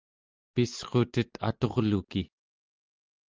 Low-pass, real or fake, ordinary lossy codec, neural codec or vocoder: 7.2 kHz; real; Opus, 24 kbps; none